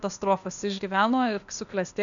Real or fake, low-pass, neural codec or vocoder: fake; 7.2 kHz; codec, 16 kHz, 0.8 kbps, ZipCodec